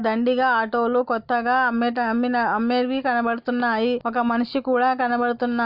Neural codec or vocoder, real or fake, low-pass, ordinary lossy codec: none; real; 5.4 kHz; Opus, 64 kbps